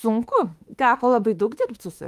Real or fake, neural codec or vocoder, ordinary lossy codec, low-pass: fake; autoencoder, 48 kHz, 32 numbers a frame, DAC-VAE, trained on Japanese speech; Opus, 32 kbps; 14.4 kHz